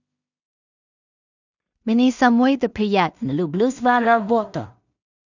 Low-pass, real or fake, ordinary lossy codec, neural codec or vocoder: 7.2 kHz; fake; none; codec, 16 kHz in and 24 kHz out, 0.4 kbps, LongCat-Audio-Codec, two codebook decoder